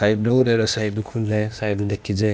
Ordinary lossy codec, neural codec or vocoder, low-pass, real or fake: none; codec, 16 kHz, 0.8 kbps, ZipCodec; none; fake